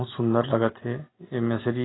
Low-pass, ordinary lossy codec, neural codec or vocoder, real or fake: 7.2 kHz; AAC, 16 kbps; none; real